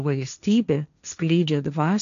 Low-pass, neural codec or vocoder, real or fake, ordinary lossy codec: 7.2 kHz; codec, 16 kHz, 1.1 kbps, Voila-Tokenizer; fake; MP3, 96 kbps